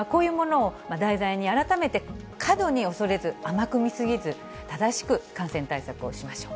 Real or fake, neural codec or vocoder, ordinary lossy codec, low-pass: real; none; none; none